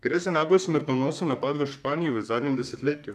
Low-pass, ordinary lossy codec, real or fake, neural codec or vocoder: 14.4 kHz; none; fake; codec, 32 kHz, 1.9 kbps, SNAC